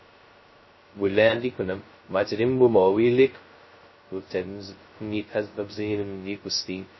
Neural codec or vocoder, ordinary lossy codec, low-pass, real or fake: codec, 16 kHz, 0.2 kbps, FocalCodec; MP3, 24 kbps; 7.2 kHz; fake